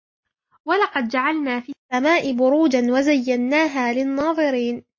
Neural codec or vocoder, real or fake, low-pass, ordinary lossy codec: none; real; 7.2 kHz; MP3, 32 kbps